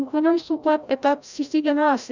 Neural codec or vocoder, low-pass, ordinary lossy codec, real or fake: codec, 16 kHz, 0.5 kbps, FreqCodec, larger model; 7.2 kHz; none; fake